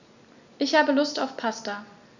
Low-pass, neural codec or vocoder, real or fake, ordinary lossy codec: 7.2 kHz; none; real; none